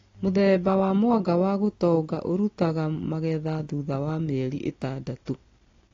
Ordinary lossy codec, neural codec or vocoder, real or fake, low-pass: AAC, 32 kbps; none; real; 7.2 kHz